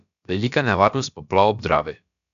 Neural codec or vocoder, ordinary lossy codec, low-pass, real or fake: codec, 16 kHz, about 1 kbps, DyCAST, with the encoder's durations; none; 7.2 kHz; fake